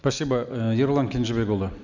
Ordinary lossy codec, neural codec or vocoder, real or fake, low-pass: none; none; real; 7.2 kHz